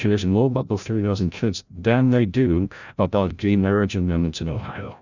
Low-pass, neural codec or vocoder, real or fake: 7.2 kHz; codec, 16 kHz, 0.5 kbps, FreqCodec, larger model; fake